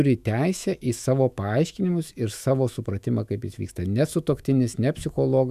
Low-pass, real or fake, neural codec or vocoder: 14.4 kHz; fake; autoencoder, 48 kHz, 128 numbers a frame, DAC-VAE, trained on Japanese speech